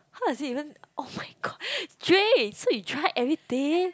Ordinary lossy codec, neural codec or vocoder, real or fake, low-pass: none; none; real; none